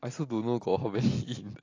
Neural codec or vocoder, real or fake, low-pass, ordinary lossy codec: none; real; 7.2 kHz; AAC, 32 kbps